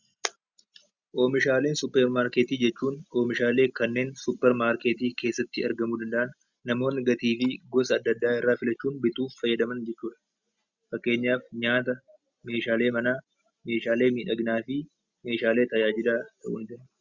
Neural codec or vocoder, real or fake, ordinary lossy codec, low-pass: none; real; Opus, 64 kbps; 7.2 kHz